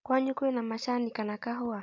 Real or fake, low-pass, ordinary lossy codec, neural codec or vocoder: real; 7.2 kHz; MP3, 64 kbps; none